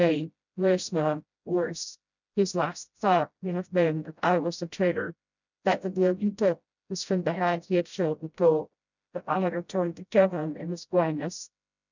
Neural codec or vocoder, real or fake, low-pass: codec, 16 kHz, 0.5 kbps, FreqCodec, smaller model; fake; 7.2 kHz